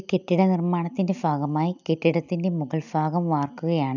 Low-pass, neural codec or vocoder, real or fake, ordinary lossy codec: 7.2 kHz; none; real; none